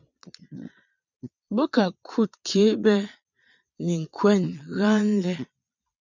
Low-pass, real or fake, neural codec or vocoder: 7.2 kHz; fake; vocoder, 22.05 kHz, 80 mel bands, Vocos